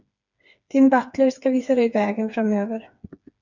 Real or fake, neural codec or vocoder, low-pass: fake; codec, 16 kHz, 4 kbps, FreqCodec, smaller model; 7.2 kHz